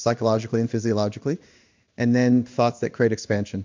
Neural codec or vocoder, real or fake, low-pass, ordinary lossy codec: codec, 16 kHz in and 24 kHz out, 1 kbps, XY-Tokenizer; fake; 7.2 kHz; MP3, 64 kbps